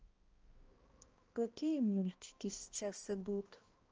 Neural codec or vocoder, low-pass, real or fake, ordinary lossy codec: codec, 16 kHz, 1 kbps, X-Codec, HuBERT features, trained on balanced general audio; 7.2 kHz; fake; Opus, 24 kbps